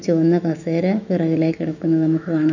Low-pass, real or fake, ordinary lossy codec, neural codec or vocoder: 7.2 kHz; fake; none; autoencoder, 48 kHz, 128 numbers a frame, DAC-VAE, trained on Japanese speech